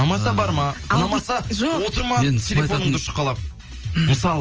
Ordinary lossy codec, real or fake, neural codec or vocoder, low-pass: Opus, 24 kbps; real; none; 7.2 kHz